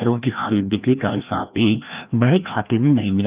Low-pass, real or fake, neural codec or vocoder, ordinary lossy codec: 3.6 kHz; fake; codec, 16 kHz, 1 kbps, FreqCodec, larger model; Opus, 32 kbps